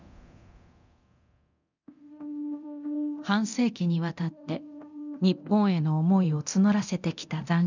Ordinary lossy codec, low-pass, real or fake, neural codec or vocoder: none; 7.2 kHz; fake; codec, 24 kHz, 0.9 kbps, DualCodec